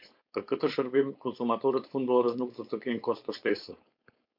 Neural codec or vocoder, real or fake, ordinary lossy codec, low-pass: codec, 16 kHz, 4.8 kbps, FACodec; fake; MP3, 48 kbps; 5.4 kHz